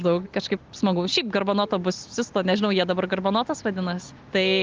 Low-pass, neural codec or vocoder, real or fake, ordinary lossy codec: 7.2 kHz; none; real; Opus, 32 kbps